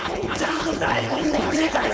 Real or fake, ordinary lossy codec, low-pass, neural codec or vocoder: fake; none; none; codec, 16 kHz, 4.8 kbps, FACodec